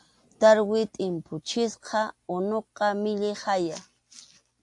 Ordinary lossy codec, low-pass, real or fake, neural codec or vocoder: AAC, 64 kbps; 10.8 kHz; real; none